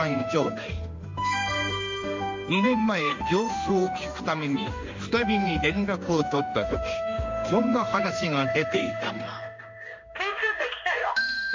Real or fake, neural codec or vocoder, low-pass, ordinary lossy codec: fake; codec, 16 kHz in and 24 kHz out, 1 kbps, XY-Tokenizer; 7.2 kHz; MP3, 48 kbps